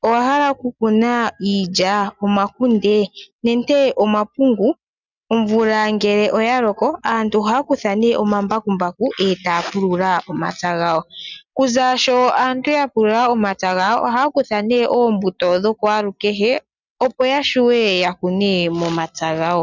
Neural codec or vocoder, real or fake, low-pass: none; real; 7.2 kHz